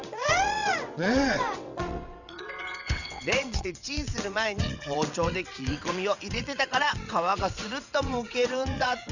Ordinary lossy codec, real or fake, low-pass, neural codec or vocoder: none; fake; 7.2 kHz; vocoder, 22.05 kHz, 80 mel bands, WaveNeXt